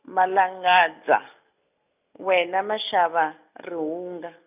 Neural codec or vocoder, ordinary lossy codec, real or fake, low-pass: none; none; real; 3.6 kHz